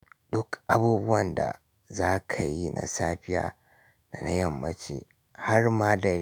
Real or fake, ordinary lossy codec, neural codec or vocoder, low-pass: fake; none; autoencoder, 48 kHz, 128 numbers a frame, DAC-VAE, trained on Japanese speech; none